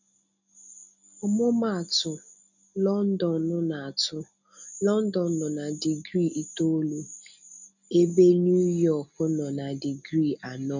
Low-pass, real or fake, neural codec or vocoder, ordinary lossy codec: 7.2 kHz; real; none; none